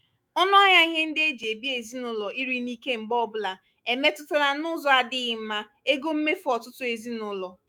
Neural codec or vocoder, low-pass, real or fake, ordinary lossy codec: autoencoder, 48 kHz, 128 numbers a frame, DAC-VAE, trained on Japanese speech; none; fake; none